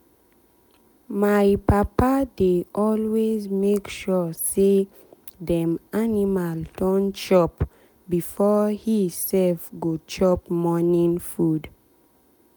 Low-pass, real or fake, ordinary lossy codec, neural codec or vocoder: none; real; none; none